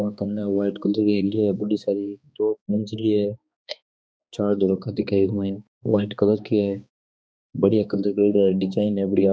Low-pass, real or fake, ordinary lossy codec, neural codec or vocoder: none; fake; none; codec, 16 kHz, 2 kbps, X-Codec, HuBERT features, trained on balanced general audio